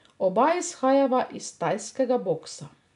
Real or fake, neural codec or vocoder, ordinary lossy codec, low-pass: real; none; none; 10.8 kHz